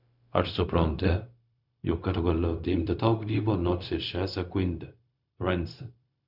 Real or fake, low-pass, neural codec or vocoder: fake; 5.4 kHz; codec, 16 kHz, 0.4 kbps, LongCat-Audio-Codec